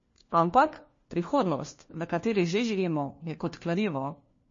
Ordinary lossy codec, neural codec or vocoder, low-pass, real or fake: MP3, 32 kbps; codec, 16 kHz, 1 kbps, FunCodec, trained on LibriTTS, 50 frames a second; 7.2 kHz; fake